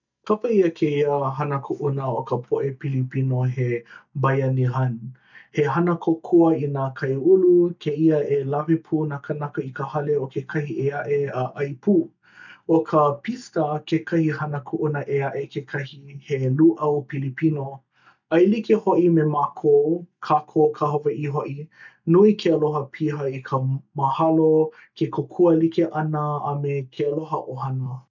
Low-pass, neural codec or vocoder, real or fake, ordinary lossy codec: 7.2 kHz; none; real; none